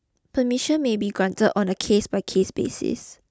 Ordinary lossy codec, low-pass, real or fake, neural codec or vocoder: none; none; real; none